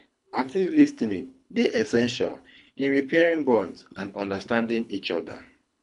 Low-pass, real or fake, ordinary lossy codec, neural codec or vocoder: 10.8 kHz; fake; none; codec, 24 kHz, 3 kbps, HILCodec